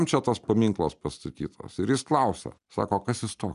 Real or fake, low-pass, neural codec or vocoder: real; 10.8 kHz; none